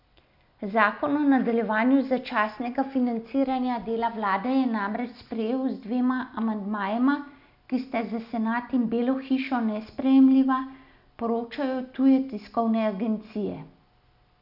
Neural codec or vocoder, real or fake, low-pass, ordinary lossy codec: none; real; 5.4 kHz; none